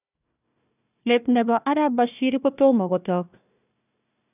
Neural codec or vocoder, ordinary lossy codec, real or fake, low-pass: codec, 16 kHz, 1 kbps, FunCodec, trained on Chinese and English, 50 frames a second; none; fake; 3.6 kHz